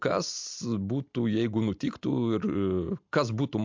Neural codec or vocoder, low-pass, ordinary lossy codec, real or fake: none; 7.2 kHz; MP3, 64 kbps; real